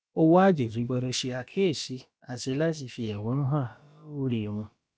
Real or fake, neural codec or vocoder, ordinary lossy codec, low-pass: fake; codec, 16 kHz, about 1 kbps, DyCAST, with the encoder's durations; none; none